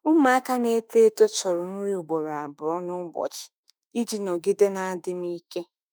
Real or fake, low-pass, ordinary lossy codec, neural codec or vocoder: fake; none; none; autoencoder, 48 kHz, 32 numbers a frame, DAC-VAE, trained on Japanese speech